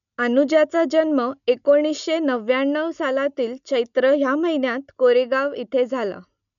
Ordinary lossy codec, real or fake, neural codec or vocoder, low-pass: none; real; none; 7.2 kHz